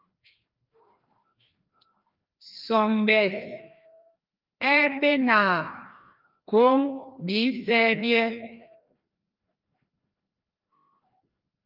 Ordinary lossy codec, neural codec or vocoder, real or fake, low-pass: Opus, 24 kbps; codec, 16 kHz, 1 kbps, FreqCodec, larger model; fake; 5.4 kHz